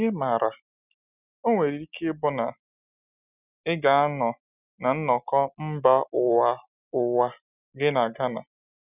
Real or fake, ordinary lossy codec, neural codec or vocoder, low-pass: real; none; none; 3.6 kHz